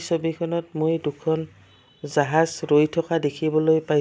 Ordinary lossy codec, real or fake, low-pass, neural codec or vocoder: none; real; none; none